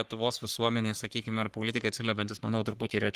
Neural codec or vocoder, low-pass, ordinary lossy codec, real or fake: codec, 44.1 kHz, 3.4 kbps, Pupu-Codec; 14.4 kHz; Opus, 24 kbps; fake